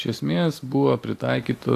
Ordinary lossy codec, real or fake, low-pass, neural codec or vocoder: AAC, 64 kbps; real; 14.4 kHz; none